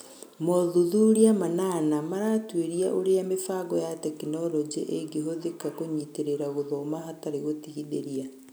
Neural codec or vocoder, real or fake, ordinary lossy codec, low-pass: none; real; none; none